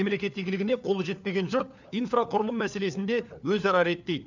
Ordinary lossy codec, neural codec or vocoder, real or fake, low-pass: none; codec, 16 kHz, 4 kbps, FunCodec, trained on LibriTTS, 50 frames a second; fake; 7.2 kHz